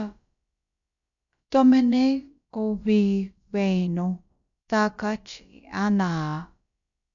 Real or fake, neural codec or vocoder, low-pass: fake; codec, 16 kHz, about 1 kbps, DyCAST, with the encoder's durations; 7.2 kHz